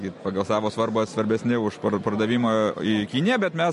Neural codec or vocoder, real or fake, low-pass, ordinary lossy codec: none; real; 14.4 kHz; MP3, 48 kbps